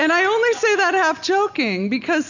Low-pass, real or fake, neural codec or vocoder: 7.2 kHz; real; none